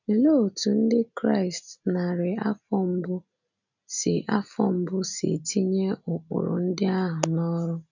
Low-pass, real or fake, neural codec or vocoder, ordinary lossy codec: 7.2 kHz; real; none; none